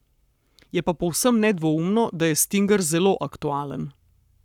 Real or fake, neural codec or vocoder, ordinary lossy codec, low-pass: fake; codec, 44.1 kHz, 7.8 kbps, Pupu-Codec; none; 19.8 kHz